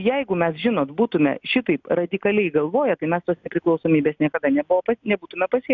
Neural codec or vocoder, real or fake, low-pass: none; real; 7.2 kHz